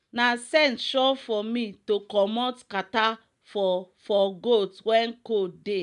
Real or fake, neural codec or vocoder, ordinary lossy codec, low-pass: real; none; MP3, 96 kbps; 10.8 kHz